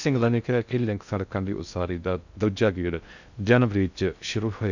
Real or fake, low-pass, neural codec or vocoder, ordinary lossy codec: fake; 7.2 kHz; codec, 16 kHz in and 24 kHz out, 0.6 kbps, FocalCodec, streaming, 2048 codes; none